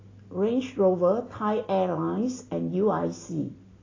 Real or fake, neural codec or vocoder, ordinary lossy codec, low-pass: real; none; AAC, 32 kbps; 7.2 kHz